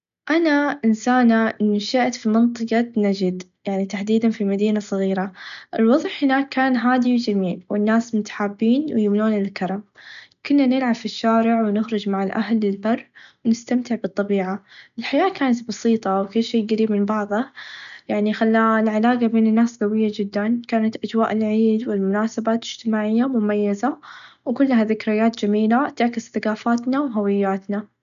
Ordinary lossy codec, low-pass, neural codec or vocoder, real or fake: none; 7.2 kHz; none; real